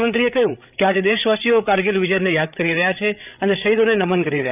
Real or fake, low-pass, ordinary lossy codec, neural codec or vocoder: fake; 3.6 kHz; none; codec, 16 kHz, 8 kbps, FreqCodec, larger model